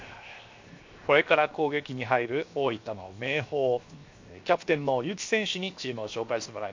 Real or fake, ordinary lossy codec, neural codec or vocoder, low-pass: fake; MP3, 48 kbps; codec, 16 kHz, 0.7 kbps, FocalCodec; 7.2 kHz